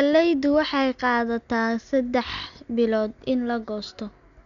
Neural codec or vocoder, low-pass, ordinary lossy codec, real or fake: none; 7.2 kHz; none; real